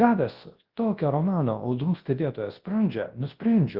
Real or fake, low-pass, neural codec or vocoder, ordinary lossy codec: fake; 5.4 kHz; codec, 24 kHz, 0.9 kbps, WavTokenizer, large speech release; Opus, 16 kbps